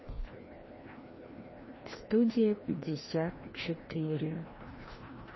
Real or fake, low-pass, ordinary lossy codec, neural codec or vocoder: fake; 7.2 kHz; MP3, 24 kbps; codec, 16 kHz, 1 kbps, FreqCodec, larger model